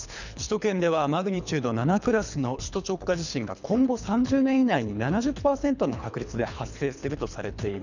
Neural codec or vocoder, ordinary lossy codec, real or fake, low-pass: codec, 24 kHz, 3 kbps, HILCodec; none; fake; 7.2 kHz